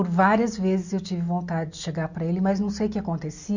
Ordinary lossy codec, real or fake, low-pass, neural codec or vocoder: none; real; 7.2 kHz; none